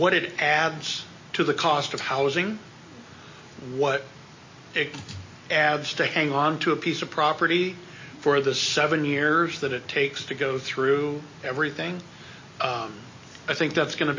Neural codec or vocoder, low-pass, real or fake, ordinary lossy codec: none; 7.2 kHz; real; MP3, 32 kbps